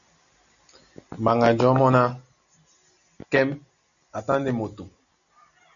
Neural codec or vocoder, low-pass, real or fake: none; 7.2 kHz; real